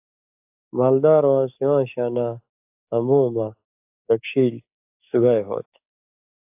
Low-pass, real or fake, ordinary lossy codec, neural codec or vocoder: 3.6 kHz; fake; AAC, 32 kbps; codec, 44.1 kHz, 7.8 kbps, DAC